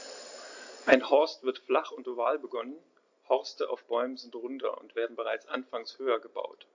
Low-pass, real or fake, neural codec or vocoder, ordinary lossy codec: 7.2 kHz; real; none; AAC, 48 kbps